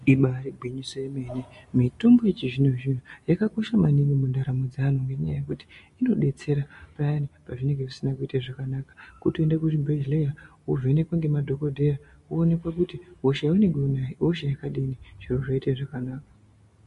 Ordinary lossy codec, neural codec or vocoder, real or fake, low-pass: MP3, 48 kbps; none; real; 14.4 kHz